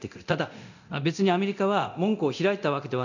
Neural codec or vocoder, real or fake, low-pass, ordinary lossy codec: codec, 24 kHz, 0.9 kbps, DualCodec; fake; 7.2 kHz; none